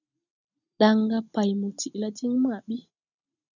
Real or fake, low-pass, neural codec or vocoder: real; 7.2 kHz; none